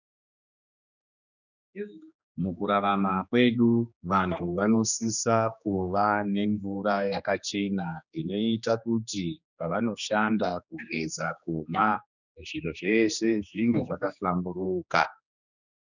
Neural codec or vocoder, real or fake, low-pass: codec, 16 kHz, 2 kbps, X-Codec, HuBERT features, trained on general audio; fake; 7.2 kHz